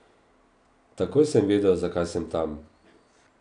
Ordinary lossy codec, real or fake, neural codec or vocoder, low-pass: none; real; none; 9.9 kHz